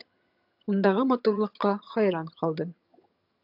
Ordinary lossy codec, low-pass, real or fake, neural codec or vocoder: AAC, 48 kbps; 5.4 kHz; fake; vocoder, 22.05 kHz, 80 mel bands, HiFi-GAN